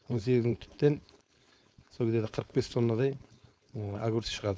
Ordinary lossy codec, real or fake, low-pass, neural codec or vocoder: none; fake; none; codec, 16 kHz, 4.8 kbps, FACodec